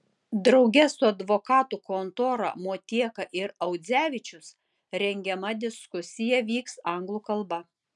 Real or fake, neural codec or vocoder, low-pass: real; none; 10.8 kHz